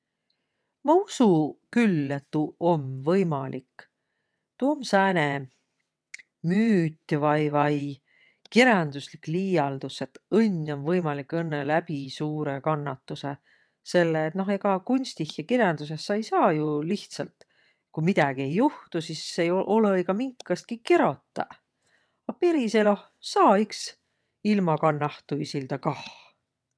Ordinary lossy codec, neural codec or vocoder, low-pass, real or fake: none; vocoder, 22.05 kHz, 80 mel bands, WaveNeXt; none; fake